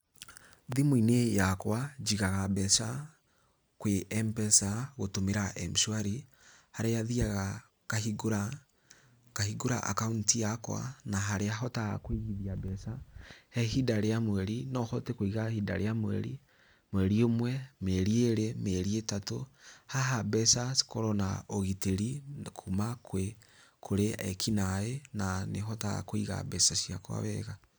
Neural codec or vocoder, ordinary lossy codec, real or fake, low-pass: none; none; real; none